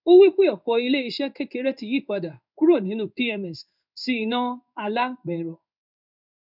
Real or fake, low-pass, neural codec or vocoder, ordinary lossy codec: fake; 5.4 kHz; codec, 16 kHz in and 24 kHz out, 1 kbps, XY-Tokenizer; none